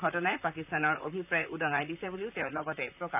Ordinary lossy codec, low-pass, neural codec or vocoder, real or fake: none; 3.6 kHz; vocoder, 44.1 kHz, 128 mel bands, Pupu-Vocoder; fake